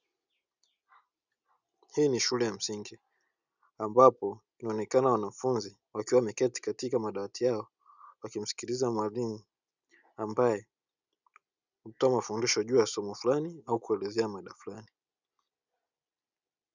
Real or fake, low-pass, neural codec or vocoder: real; 7.2 kHz; none